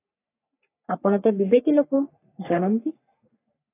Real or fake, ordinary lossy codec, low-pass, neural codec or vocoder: fake; AAC, 24 kbps; 3.6 kHz; codec, 44.1 kHz, 3.4 kbps, Pupu-Codec